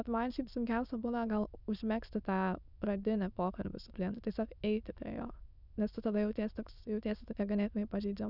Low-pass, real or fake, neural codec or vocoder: 5.4 kHz; fake; autoencoder, 22.05 kHz, a latent of 192 numbers a frame, VITS, trained on many speakers